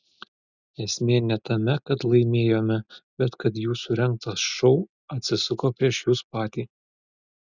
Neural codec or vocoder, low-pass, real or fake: none; 7.2 kHz; real